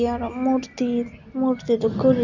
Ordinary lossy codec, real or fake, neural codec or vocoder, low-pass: none; real; none; 7.2 kHz